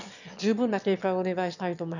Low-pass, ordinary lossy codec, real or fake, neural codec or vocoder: 7.2 kHz; none; fake; autoencoder, 22.05 kHz, a latent of 192 numbers a frame, VITS, trained on one speaker